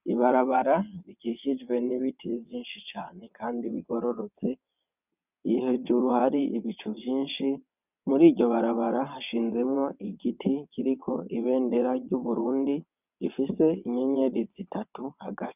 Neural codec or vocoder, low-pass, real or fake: vocoder, 22.05 kHz, 80 mel bands, WaveNeXt; 3.6 kHz; fake